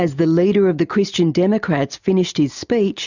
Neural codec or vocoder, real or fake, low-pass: none; real; 7.2 kHz